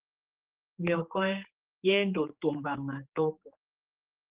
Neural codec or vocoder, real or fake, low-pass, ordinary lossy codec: codec, 16 kHz, 4 kbps, X-Codec, HuBERT features, trained on general audio; fake; 3.6 kHz; Opus, 16 kbps